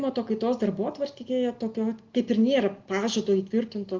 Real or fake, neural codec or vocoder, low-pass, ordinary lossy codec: real; none; 7.2 kHz; Opus, 32 kbps